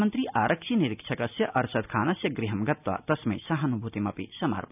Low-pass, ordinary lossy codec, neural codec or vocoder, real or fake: 3.6 kHz; none; none; real